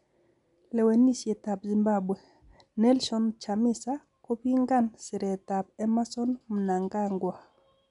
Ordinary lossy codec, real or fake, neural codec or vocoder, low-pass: none; real; none; 10.8 kHz